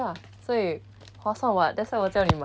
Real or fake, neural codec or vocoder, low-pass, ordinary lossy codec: real; none; none; none